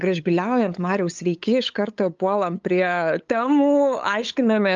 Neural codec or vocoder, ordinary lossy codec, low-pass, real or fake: codec, 16 kHz, 8 kbps, FunCodec, trained on LibriTTS, 25 frames a second; Opus, 24 kbps; 7.2 kHz; fake